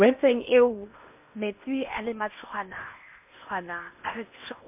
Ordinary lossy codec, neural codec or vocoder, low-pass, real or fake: MP3, 32 kbps; codec, 16 kHz in and 24 kHz out, 0.8 kbps, FocalCodec, streaming, 65536 codes; 3.6 kHz; fake